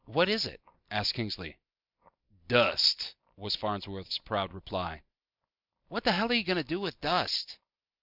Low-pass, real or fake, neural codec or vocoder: 5.4 kHz; real; none